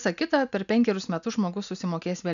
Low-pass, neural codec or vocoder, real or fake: 7.2 kHz; none; real